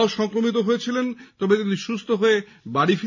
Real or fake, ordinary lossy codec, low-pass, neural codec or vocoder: real; none; 7.2 kHz; none